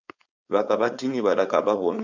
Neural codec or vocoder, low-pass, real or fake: codec, 16 kHz, 4.8 kbps, FACodec; 7.2 kHz; fake